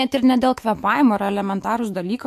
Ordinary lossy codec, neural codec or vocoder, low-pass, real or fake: AAC, 64 kbps; autoencoder, 48 kHz, 128 numbers a frame, DAC-VAE, trained on Japanese speech; 14.4 kHz; fake